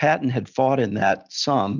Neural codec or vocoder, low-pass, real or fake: none; 7.2 kHz; real